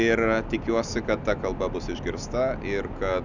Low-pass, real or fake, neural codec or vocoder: 7.2 kHz; real; none